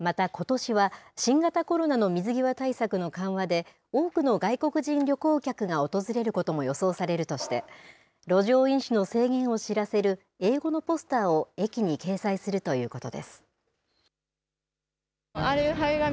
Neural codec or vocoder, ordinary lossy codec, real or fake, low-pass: none; none; real; none